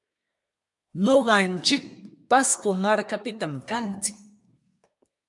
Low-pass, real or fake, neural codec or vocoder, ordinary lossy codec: 10.8 kHz; fake; codec, 24 kHz, 1 kbps, SNAC; MP3, 96 kbps